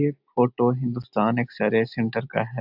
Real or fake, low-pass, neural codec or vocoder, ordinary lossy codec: real; 5.4 kHz; none; AAC, 48 kbps